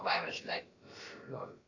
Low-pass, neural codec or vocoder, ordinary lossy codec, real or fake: 7.2 kHz; codec, 16 kHz, about 1 kbps, DyCAST, with the encoder's durations; AAC, 48 kbps; fake